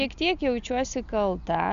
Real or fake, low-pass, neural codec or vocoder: real; 7.2 kHz; none